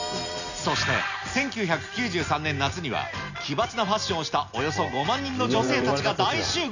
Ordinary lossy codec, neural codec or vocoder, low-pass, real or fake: none; none; 7.2 kHz; real